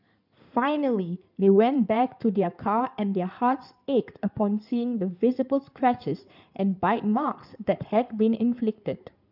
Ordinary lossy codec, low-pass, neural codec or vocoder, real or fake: none; 5.4 kHz; codec, 16 kHz in and 24 kHz out, 2.2 kbps, FireRedTTS-2 codec; fake